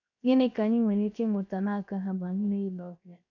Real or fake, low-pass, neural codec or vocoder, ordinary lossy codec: fake; 7.2 kHz; codec, 16 kHz, 0.7 kbps, FocalCodec; none